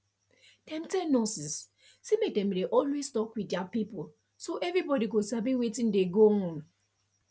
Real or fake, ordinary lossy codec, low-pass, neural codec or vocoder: real; none; none; none